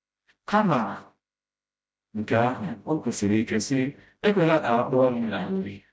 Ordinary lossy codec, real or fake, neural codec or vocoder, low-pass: none; fake; codec, 16 kHz, 0.5 kbps, FreqCodec, smaller model; none